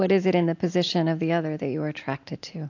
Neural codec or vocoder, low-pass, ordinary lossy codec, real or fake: none; 7.2 kHz; AAC, 48 kbps; real